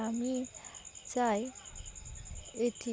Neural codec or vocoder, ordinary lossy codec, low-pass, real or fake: none; none; none; real